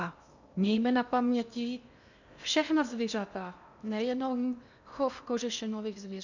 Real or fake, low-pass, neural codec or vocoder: fake; 7.2 kHz; codec, 16 kHz in and 24 kHz out, 0.8 kbps, FocalCodec, streaming, 65536 codes